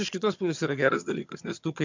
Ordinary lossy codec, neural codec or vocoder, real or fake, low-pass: MP3, 64 kbps; vocoder, 22.05 kHz, 80 mel bands, HiFi-GAN; fake; 7.2 kHz